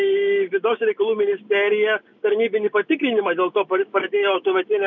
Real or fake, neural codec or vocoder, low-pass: real; none; 7.2 kHz